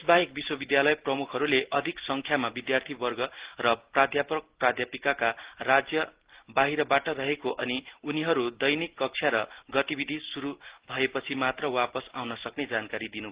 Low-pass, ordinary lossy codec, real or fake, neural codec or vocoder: 3.6 kHz; Opus, 16 kbps; real; none